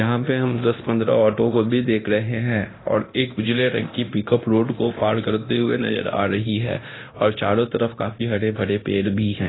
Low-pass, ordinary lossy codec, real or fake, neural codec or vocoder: 7.2 kHz; AAC, 16 kbps; fake; codec, 24 kHz, 0.9 kbps, DualCodec